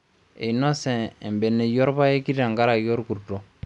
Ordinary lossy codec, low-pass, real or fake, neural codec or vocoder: none; 10.8 kHz; real; none